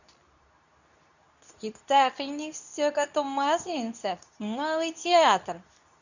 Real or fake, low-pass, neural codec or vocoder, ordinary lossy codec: fake; 7.2 kHz; codec, 24 kHz, 0.9 kbps, WavTokenizer, medium speech release version 2; none